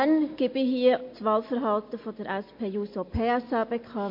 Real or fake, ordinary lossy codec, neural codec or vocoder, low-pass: real; MP3, 32 kbps; none; 5.4 kHz